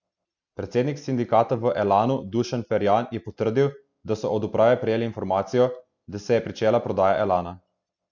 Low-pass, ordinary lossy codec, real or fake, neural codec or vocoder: 7.2 kHz; none; real; none